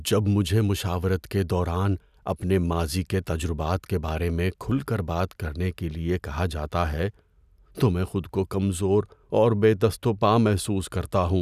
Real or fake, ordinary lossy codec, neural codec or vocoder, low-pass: real; none; none; 14.4 kHz